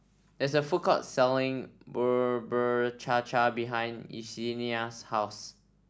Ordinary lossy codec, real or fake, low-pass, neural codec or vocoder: none; real; none; none